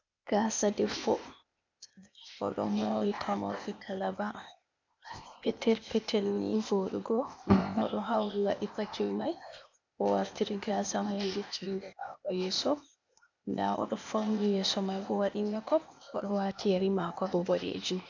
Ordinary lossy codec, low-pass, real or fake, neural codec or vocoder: MP3, 64 kbps; 7.2 kHz; fake; codec, 16 kHz, 0.8 kbps, ZipCodec